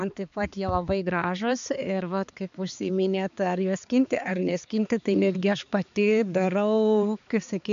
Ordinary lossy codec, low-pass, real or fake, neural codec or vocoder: MP3, 64 kbps; 7.2 kHz; fake; codec, 16 kHz, 4 kbps, X-Codec, HuBERT features, trained on balanced general audio